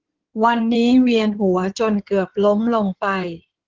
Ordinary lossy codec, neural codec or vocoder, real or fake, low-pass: Opus, 16 kbps; codec, 16 kHz in and 24 kHz out, 2.2 kbps, FireRedTTS-2 codec; fake; 7.2 kHz